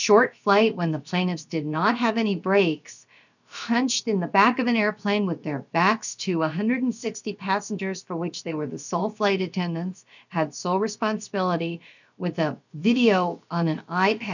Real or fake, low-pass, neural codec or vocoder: fake; 7.2 kHz; codec, 16 kHz, about 1 kbps, DyCAST, with the encoder's durations